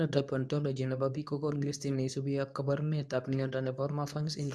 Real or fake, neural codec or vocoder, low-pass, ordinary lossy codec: fake; codec, 24 kHz, 0.9 kbps, WavTokenizer, medium speech release version 2; none; none